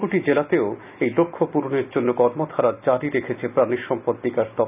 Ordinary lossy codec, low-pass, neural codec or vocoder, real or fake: none; 3.6 kHz; none; real